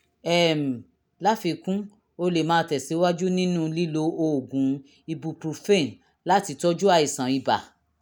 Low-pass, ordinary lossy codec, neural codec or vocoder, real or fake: none; none; none; real